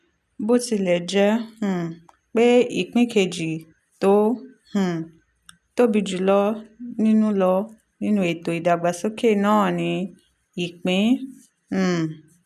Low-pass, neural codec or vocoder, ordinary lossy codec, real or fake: 14.4 kHz; none; none; real